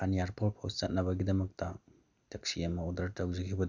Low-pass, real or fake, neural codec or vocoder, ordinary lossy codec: 7.2 kHz; real; none; none